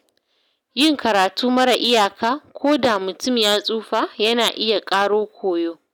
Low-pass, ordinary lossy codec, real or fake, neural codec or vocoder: none; none; real; none